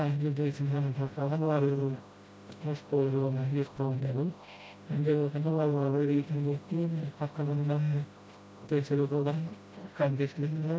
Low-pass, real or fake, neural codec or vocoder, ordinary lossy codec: none; fake; codec, 16 kHz, 0.5 kbps, FreqCodec, smaller model; none